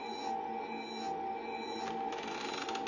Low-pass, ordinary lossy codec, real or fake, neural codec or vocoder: 7.2 kHz; none; real; none